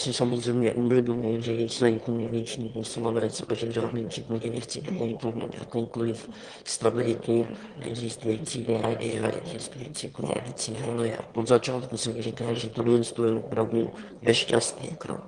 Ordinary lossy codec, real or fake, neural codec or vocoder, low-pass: Opus, 24 kbps; fake; autoencoder, 22.05 kHz, a latent of 192 numbers a frame, VITS, trained on one speaker; 9.9 kHz